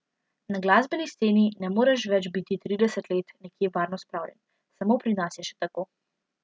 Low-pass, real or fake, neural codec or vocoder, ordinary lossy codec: none; real; none; none